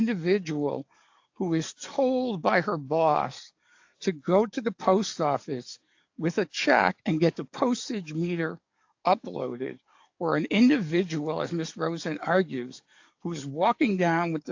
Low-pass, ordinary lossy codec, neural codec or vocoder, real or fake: 7.2 kHz; AAC, 48 kbps; codec, 44.1 kHz, 7.8 kbps, DAC; fake